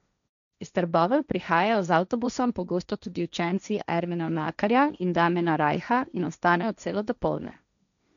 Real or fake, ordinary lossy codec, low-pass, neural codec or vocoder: fake; none; 7.2 kHz; codec, 16 kHz, 1.1 kbps, Voila-Tokenizer